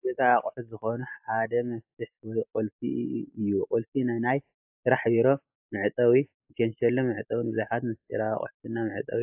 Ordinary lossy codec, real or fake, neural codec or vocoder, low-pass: AAC, 32 kbps; real; none; 3.6 kHz